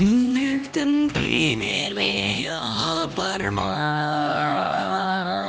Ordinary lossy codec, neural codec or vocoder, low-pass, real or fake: none; codec, 16 kHz, 1 kbps, X-Codec, HuBERT features, trained on LibriSpeech; none; fake